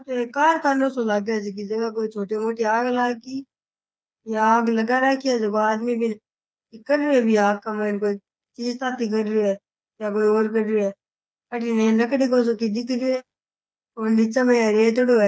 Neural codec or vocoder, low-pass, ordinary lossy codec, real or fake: codec, 16 kHz, 4 kbps, FreqCodec, smaller model; none; none; fake